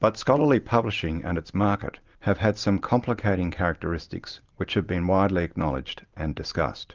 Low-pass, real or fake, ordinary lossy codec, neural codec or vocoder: 7.2 kHz; real; Opus, 24 kbps; none